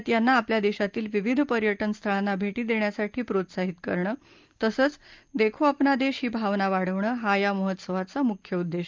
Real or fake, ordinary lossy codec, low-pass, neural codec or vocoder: real; Opus, 24 kbps; 7.2 kHz; none